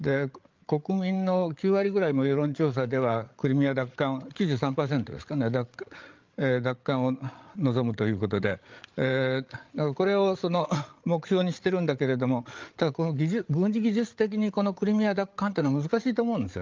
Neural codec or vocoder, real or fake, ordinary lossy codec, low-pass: codec, 16 kHz, 8 kbps, FreqCodec, larger model; fake; Opus, 24 kbps; 7.2 kHz